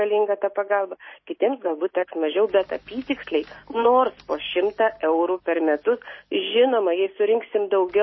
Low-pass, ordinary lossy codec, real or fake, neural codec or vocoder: 7.2 kHz; MP3, 24 kbps; real; none